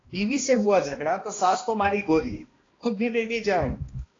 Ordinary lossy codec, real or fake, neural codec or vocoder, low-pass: AAC, 32 kbps; fake; codec, 16 kHz, 1 kbps, X-Codec, HuBERT features, trained on balanced general audio; 7.2 kHz